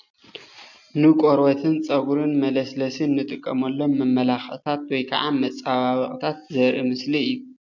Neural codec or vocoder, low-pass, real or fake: none; 7.2 kHz; real